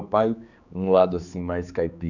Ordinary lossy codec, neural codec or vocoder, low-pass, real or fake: none; codec, 16 kHz, 4 kbps, X-Codec, HuBERT features, trained on general audio; 7.2 kHz; fake